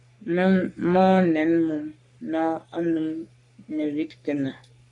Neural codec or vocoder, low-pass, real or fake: codec, 44.1 kHz, 3.4 kbps, Pupu-Codec; 10.8 kHz; fake